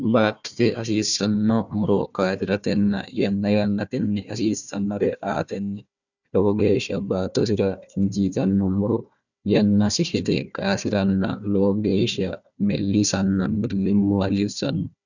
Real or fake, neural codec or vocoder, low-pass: fake; codec, 16 kHz, 1 kbps, FunCodec, trained on Chinese and English, 50 frames a second; 7.2 kHz